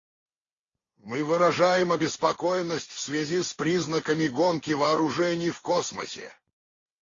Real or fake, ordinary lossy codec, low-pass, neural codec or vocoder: real; AAC, 48 kbps; 7.2 kHz; none